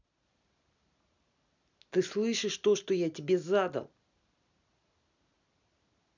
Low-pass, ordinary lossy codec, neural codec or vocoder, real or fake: 7.2 kHz; none; none; real